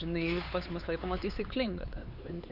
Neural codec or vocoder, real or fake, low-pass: codec, 16 kHz, 4 kbps, X-Codec, HuBERT features, trained on LibriSpeech; fake; 5.4 kHz